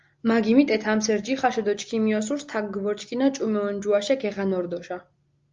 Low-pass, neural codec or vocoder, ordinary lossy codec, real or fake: 7.2 kHz; none; Opus, 32 kbps; real